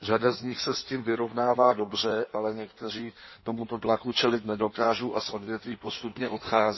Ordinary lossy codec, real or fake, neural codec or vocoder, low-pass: MP3, 24 kbps; fake; codec, 16 kHz in and 24 kHz out, 1.1 kbps, FireRedTTS-2 codec; 7.2 kHz